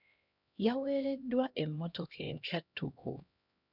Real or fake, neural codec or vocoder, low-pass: fake; codec, 16 kHz, 1 kbps, X-Codec, HuBERT features, trained on LibriSpeech; 5.4 kHz